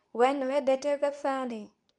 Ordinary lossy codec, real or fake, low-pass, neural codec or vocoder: none; fake; 10.8 kHz; codec, 24 kHz, 0.9 kbps, WavTokenizer, medium speech release version 2